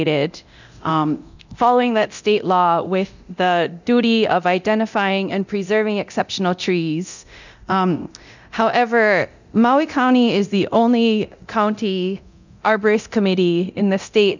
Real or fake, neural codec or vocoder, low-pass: fake; codec, 24 kHz, 0.9 kbps, DualCodec; 7.2 kHz